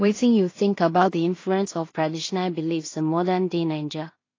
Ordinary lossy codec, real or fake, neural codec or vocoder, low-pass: AAC, 32 kbps; fake; codec, 16 kHz in and 24 kHz out, 0.4 kbps, LongCat-Audio-Codec, two codebook decoder; 7.2 kHz